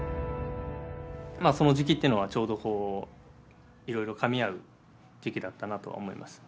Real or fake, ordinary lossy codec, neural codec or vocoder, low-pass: real; none; none; none